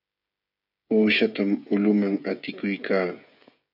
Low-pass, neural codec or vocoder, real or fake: 5.4 kHz; codec, 16 kHz, 16 kbps, FreqCodec, smaller model; fake